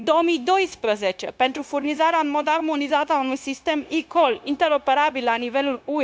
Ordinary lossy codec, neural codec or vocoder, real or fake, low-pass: none; codec, 16 kHz, 0.9 kbps, LongCat-Audio-Codec; fake; none